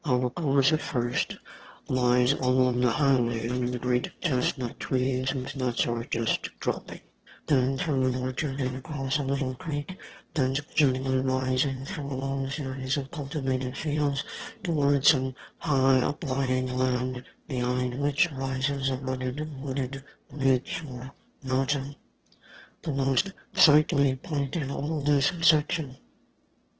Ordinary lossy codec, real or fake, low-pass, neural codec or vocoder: Opus, 16 kbps; fake; 7.2 kHz; autoencoder, 22.05 kHz, a latent of 192 numbers a frame, VITS, trained on one speaker